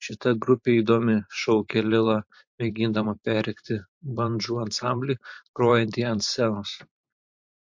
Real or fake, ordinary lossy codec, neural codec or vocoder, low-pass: fake; MP3, 48 kbps; vocoder, 44.1 kHz, 128 mel bands every 512 samples, BigVGAN v2; 7.2 kHz